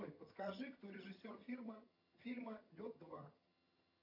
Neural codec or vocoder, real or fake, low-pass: vocoder, 22.05 kHz, 80 mel bands, HiFi-GAN; fake; 5.4 kHz